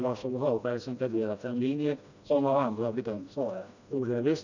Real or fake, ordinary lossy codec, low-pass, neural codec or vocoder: fake; none; 7.2 kHz; codec, 16 kHz, 1 kbps, FreqCodec, smaller model